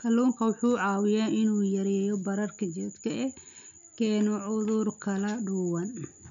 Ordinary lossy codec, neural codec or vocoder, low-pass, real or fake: none; none; 7.2 kHz; real